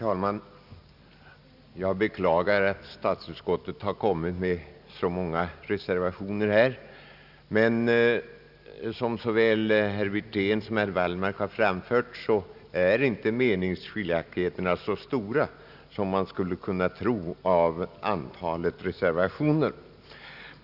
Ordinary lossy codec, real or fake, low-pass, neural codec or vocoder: none; real; 5.4 kHz; none